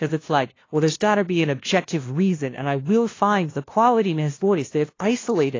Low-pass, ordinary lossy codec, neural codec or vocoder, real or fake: 7.2 kHz; AAC, 32 kbps; codec, 16 kHz, 0.5 kbps, FunCodec, trained on LibriTTS, 25 frames a second; fake